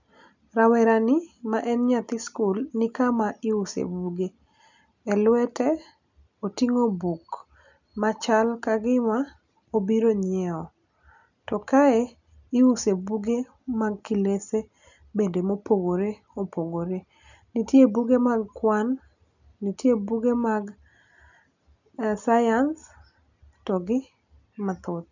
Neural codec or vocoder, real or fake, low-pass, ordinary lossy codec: none; real; 7.2 kHz; none